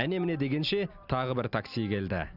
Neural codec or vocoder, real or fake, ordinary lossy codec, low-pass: none; real; none; 5.4 kHz